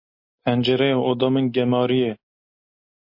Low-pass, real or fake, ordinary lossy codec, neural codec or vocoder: 5.4 kHz; real; MP3, 32 kbps; none